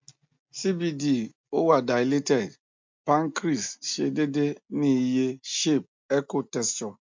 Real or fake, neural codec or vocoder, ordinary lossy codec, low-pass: real; none; MP3, 64 kbps; 7.2 kHz